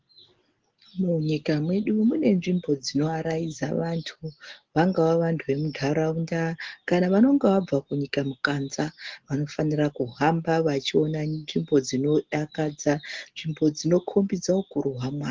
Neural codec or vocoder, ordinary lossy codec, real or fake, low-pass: none; Opus, 16 kbps; real; 7.2 kHz